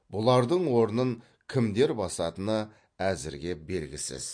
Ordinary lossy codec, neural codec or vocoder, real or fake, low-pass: MP3, 48 kbps; none; real; 9.9 kHz